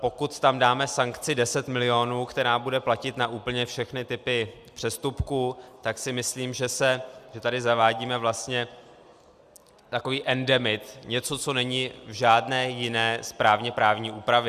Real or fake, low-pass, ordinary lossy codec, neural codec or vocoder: real; 14.4 kHz; Opus, 64 kbps; none